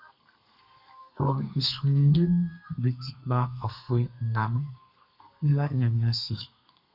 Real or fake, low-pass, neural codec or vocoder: fake; 5.4 kHz; codec, 32 kHz, 1.9 kbps, SNAC